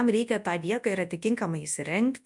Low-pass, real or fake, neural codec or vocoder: 10.8 kHz; fake; codec, 24 kHz, 0.9 kbps, WavTokenizer, large speech release